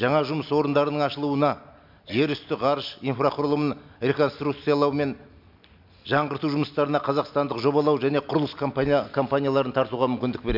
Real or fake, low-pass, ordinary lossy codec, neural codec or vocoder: real; 5.4 kHz; MP3, 48 kbps; none